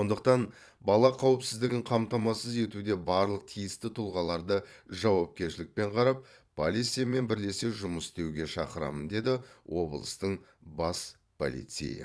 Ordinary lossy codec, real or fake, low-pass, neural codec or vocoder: none; fake; none; vocoder, 22.05 kHz, 80 mel bands, Vocos